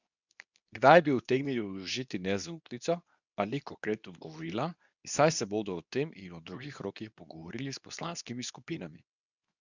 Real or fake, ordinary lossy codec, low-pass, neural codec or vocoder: fake; none; 7.2 kHz; codec, 24 kHz, 0.9 kbps, WavTokenizer, medium speech release version 2